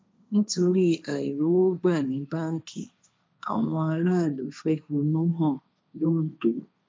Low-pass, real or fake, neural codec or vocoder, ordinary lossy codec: none; fake; codec, 16 kHz, 1.1 kbps, Voila-Tokenizer; none